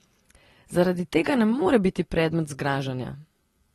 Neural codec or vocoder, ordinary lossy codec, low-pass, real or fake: none; AAC, 32 kbps; 19.8 kHz; real